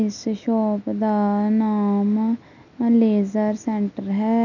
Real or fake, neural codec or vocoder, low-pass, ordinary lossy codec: real; none; 7.2 kHz; none